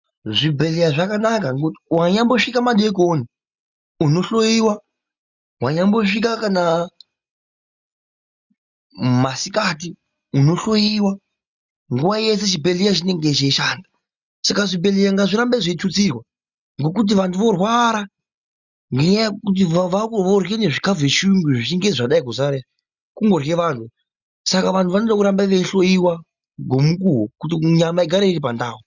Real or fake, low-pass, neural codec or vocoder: real; 7.2 kHz; none